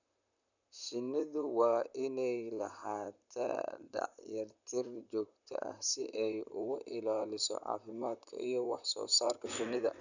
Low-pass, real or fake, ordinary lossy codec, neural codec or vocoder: 7.2 kHz; fake; none; vocoder, 44.1 kHz, 128 mel bands, Pupu-Vocoder